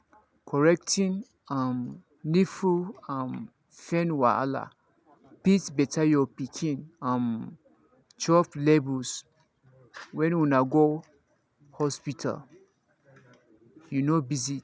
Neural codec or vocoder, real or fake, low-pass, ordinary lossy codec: none; real; none; none